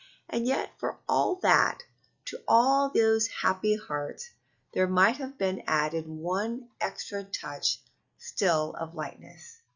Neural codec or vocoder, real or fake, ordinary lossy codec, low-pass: none; real; Opus, 64 kbps; 7.2 kHz